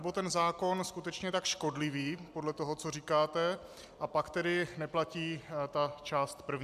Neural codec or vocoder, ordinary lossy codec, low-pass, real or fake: none; AAC, 96 kbps; 14.4 kHz; real